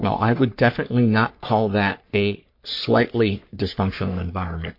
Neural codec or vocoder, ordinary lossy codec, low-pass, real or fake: codec, 44.1 kHz, 3.4 kbps, Pupu-Codec; MP3, 32 kbps; 5.4 kHz; fake